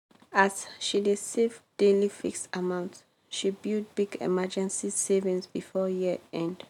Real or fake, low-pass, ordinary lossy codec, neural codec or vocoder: real; 19.8 kHz; none; none